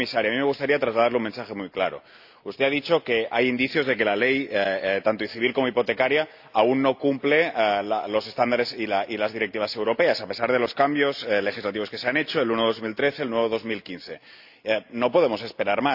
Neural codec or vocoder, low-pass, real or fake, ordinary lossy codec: none; 5.4 kHz; real; AAC, 48 kbps